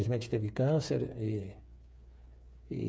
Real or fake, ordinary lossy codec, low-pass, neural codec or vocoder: fake; none; none; codec, 16 kHz, 8 kbps, FreqCodec, smaller model